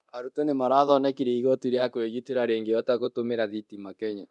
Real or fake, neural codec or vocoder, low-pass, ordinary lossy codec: fake; codec, 24 kHz, 0.9 kbps, DualCodec; 10.8 kHz; none